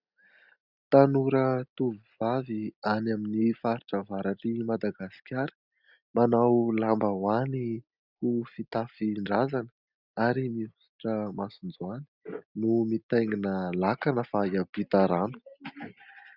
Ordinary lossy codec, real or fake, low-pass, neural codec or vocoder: Opus, 64 kbps; real; 5.4 kHz; none